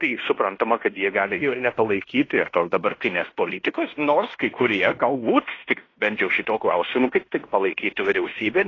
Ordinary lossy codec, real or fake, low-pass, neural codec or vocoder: AAC, 32 kbps; fake; 7.2 kHz; codec, 16 kHz in and 24 kHz out, 0.9 kbps, LongCat-Audio-Codec, fine tuned four codebook decoder